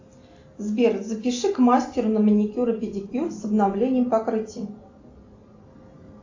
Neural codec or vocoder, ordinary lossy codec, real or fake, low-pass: none; AAC, 48 kbps; real; 7.2 kHz